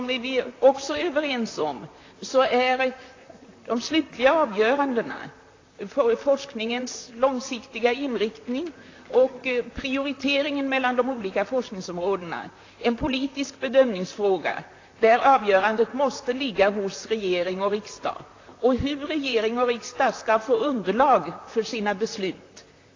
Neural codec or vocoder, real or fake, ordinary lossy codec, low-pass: vocoder, 44.1 kHz, 128 mel bands, Pupu-Vocoder; fake; AAC, 32 kbps; 7.2 kHz